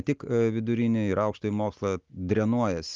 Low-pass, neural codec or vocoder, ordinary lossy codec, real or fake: 7.2 kHz; none; Opus, 32 kbps; real